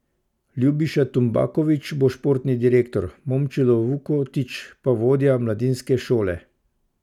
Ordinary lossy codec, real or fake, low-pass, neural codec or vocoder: none; real; 19.8 kHz; none